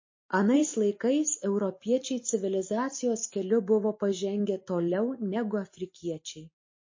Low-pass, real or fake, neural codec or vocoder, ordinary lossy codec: 7.2 kHz; real; none; MP3, 32 kbps